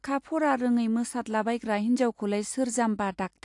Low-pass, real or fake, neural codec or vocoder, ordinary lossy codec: 10.8 kHz; real; none; AAC, 64 kbps